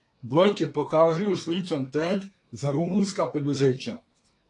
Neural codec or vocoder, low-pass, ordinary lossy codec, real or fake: codec, 24 kHz, 1 kbps, SNAC; 10.8 kHz; AAC, 48 kbps; fake